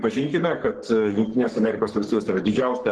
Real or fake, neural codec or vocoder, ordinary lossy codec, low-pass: fake; codec, 44.1 kHz, 3.4 kbps, Pupu-Codec; Opus, 16 kbps; 10.8 kHz